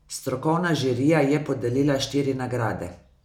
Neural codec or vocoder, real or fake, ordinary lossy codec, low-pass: none; real; none; 19.8 kHz